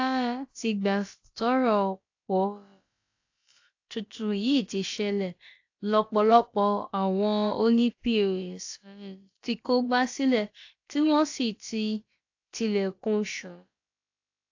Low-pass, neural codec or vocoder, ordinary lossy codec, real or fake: 7.2 kHz; codec, 16 kHz, about 1 kbps, DyCAST, with the encoder's durations; AAC, 48 kbps; fake